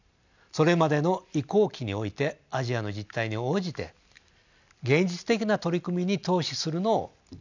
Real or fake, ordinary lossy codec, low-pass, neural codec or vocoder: real; none; 7.2 kHz; none